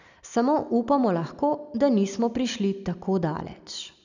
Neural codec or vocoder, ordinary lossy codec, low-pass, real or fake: none; none; 7.2 kHz; real